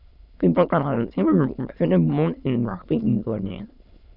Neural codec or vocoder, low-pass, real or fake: autoencoder, 22.05 kHz, a latent of 192 numbers a frame, VITS, trained on many speakers; 5.4 kHz; fake